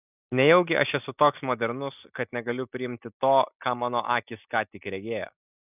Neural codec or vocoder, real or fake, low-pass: none; real; 3.6 kHz